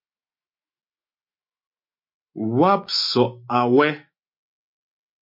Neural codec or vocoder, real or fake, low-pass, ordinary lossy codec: autoencoder, 48 kHz, 128 numbers a frame, DAC-VAE, trained on Japanese speech; fake; 5.4 kHz; MP3, 32 kbps